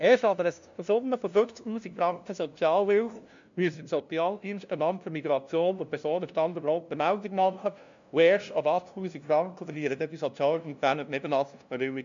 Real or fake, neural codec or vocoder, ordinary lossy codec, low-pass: fake; codec, 16 kHz, 0.5 kbps, FunCodec, trained on LibriTTS, 25 frames a second; MP3, 64 kbps; 7.2 kHz